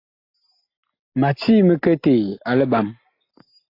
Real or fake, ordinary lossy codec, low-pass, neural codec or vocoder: real; AAC, 32 kbps; 5.4 kHz; none